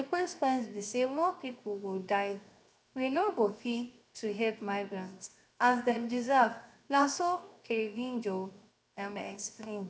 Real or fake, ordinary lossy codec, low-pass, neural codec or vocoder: fake; none; none; codec, 16 kHz, 0.7 kbps, FocalCodec